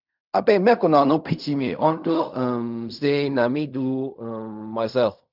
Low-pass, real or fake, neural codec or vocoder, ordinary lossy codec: 5.4 kHz; fake; codec, 16 kHz in and 24 kHz out, 0.4 kbps, LongCat-Audio-Codec, fine tuned four codebook decoder; none